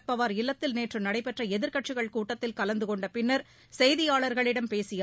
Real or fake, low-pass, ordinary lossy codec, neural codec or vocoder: real; none; none; none